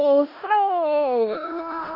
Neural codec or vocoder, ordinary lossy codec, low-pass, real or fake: codec, 16 kHz in and 24 kHz out, 0.4 kbps, LongCat-Audio-Codec, four codebook decoder; AAC, 32 kbps; 5.4 kHz; fake